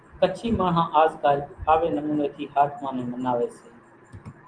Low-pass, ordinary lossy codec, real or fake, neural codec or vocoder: 9.9 kHz; Opus, 24 kbps; real; none